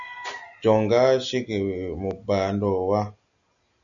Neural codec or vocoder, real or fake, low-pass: none; real; 7.2 kHz